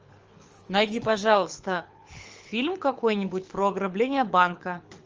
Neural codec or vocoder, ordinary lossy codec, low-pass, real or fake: codec, 24 kHz, 6 kbps, HILCodec; Opus, 24 kbps; 7.2 kHz; fake